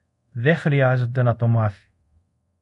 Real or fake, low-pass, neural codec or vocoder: fake; 10.8 kHz; codec, 24 kHz, 0.5 kbps, DualCodec